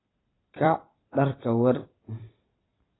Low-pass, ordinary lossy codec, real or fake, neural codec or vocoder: 7.2 kHz; AAC, 16 kbps; fake; vocoder, 44.1 kHz, 128 mel bands every 256 samples, BigVGAN v2